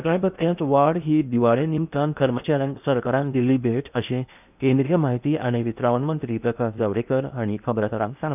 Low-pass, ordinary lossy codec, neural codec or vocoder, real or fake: 3.6 kHz; none; codec, 16 kHz in and 24 kHz out, 0.8 kbps, FocalCodec, streaming, 65536 codes; fake